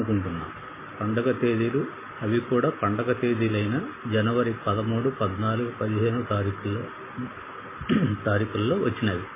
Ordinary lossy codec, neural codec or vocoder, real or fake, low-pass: MP3, 16 kbps; none; real; 3.6 kHz